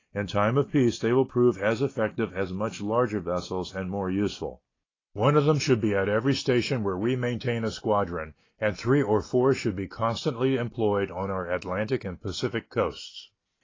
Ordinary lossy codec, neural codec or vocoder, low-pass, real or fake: AAC, 32 kbps; none; 7.2 kHz; real